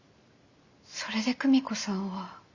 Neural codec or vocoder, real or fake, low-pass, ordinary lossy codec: none; real; 7.2 kHz; Opus, 64 kbps